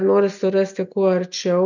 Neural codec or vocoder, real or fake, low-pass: none; real; 7.2 kHz